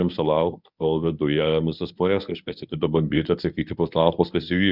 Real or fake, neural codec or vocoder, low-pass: fake; codec, 24 kHz, 0.9 kbps, WavTokenizer, medium speech release version 1; 5.4 kHz